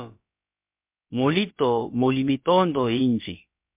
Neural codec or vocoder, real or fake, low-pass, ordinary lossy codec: codec, 16 kHz, about 1 kbps, DyCAST, with the encoder's durations; fake; 3.6 kHz; MP3, 32 kbps